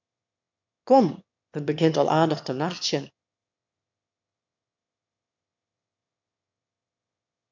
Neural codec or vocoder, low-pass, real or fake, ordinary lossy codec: autoencoder, 22.05 kHz, a latent of 192 numbers a frame, VITS, trained on one speaker; 7.2 kHz; fake; MP3, 64 kbps